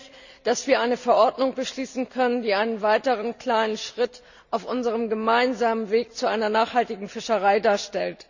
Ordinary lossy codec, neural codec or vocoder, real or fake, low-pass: none; none; real; 7.2 kHz